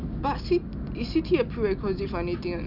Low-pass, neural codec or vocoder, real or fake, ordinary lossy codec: 5.4 kHz; none; real; none